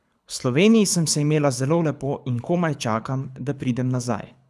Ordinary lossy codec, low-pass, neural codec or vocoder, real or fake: none; none; codec, 24 kHz, 6 kbps, HILCodec; fake